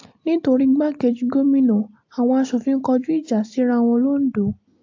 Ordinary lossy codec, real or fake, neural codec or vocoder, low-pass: AAC, 48 kbps; real; none; 7.2 kHz